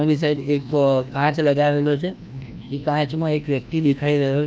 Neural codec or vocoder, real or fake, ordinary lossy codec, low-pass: codec, 16 kHz, 1 kbps, FreqCodec, larger model; fake; none; none